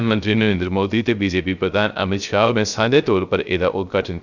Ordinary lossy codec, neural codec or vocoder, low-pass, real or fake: none; codec, 16 kHz, 0.3 kbps, FocalCodec; 7.2 kHz; fake